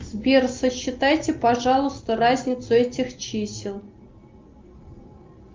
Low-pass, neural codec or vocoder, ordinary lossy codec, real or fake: 7.2 kHz; none; Opus, 32 kbps; real